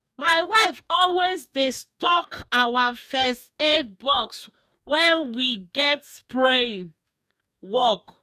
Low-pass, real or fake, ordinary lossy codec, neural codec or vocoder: 14.4 kHz; fake; none; codec, 44.1 kHz, 2.6 kbps, DAC